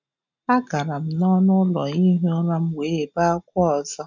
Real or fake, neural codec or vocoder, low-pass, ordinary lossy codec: real; none; 7.2 kHz; none